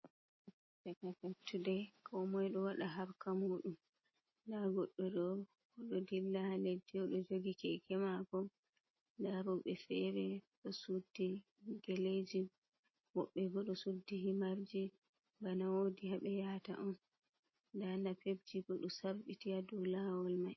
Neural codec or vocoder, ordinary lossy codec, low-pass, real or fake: none; MP3, 24 kbps; 7.2 kHz; real